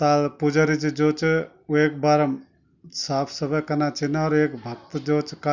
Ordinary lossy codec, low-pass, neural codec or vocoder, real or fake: none; 7.2 kHz; none; real